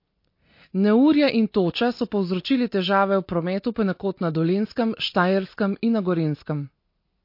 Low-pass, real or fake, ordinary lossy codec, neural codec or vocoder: 5.4 kHz; real; MP3, 32 kbps; none